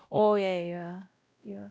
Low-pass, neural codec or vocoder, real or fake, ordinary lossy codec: none; codec, 16 kHz, 0.5 kbps, X-Codec, WavLM features, trained on Multilingual LibriSpeech; fake; none